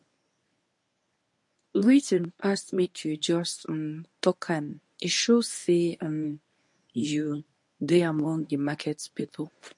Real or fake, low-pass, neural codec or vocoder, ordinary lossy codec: fake; 10.8 kHz; codec, 24 kHz, 0.9 kbps, WavTokenizer, medium speech release version 1; MP3, 48 kbps